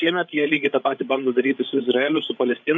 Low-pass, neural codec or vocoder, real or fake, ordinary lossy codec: 7.2 kHz; codec, 16 kHz, 8 kbps, FreqCodec, larger model; fake; MP3, 64 kbps